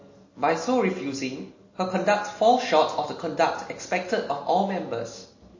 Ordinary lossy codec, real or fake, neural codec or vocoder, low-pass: MP3, 32 kbps; real; none; 7.2 kHz